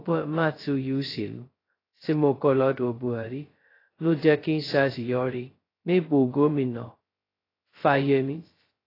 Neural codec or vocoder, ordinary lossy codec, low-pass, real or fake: codec, 16 kHz, 0.2 kbps, FocalCodec; AAC, 24 kbps; 5.4 kHz; fake